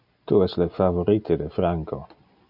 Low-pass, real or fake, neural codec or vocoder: 5.4 kHz; real; none